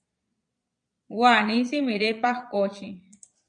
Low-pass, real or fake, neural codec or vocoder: 9.9 kHz; fake; vocoder, 22.05 kHz, 80 mel bands, Vocos